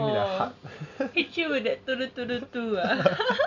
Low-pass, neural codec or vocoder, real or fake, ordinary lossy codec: 7.2 kHz; none; real; none